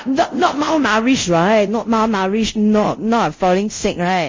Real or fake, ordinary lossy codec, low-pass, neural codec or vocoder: fake; MP3, 32 kbps; 7.2 kHz; codec, 24 kHz, 0.5 kbps, DualCodec